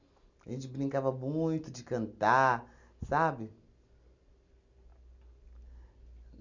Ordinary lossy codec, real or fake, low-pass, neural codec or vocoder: none; real; 7.2 kHz; none